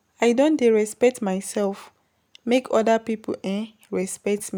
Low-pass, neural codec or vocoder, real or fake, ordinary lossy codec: none; none; real; none